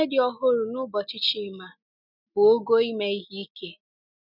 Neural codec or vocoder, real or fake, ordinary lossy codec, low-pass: none; real; none; 5.4 kHz